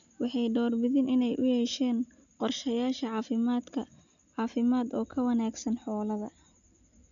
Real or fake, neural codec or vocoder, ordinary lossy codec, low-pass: real; none; none; 7.2 kHz